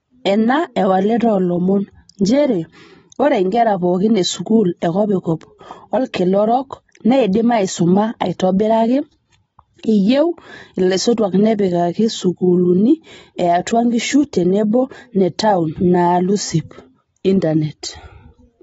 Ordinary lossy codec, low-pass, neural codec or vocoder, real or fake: AAC, 24 kbps; 19.8 kHz; none; real